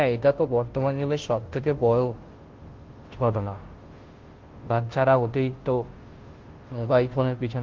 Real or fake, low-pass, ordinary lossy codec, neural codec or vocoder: fake; 7.2 kHz; Opus, 16 kbps; codec, 16 kHz, 0.5 kbps, FunCodec, trained on Chinese and English, 25 frames a second